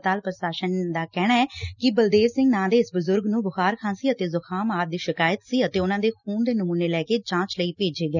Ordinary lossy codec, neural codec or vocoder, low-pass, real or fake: none; none; 7.2 kHz; real